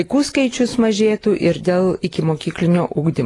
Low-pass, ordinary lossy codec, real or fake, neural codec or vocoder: 10.8 kHz; AAC, 32 kbps; real; none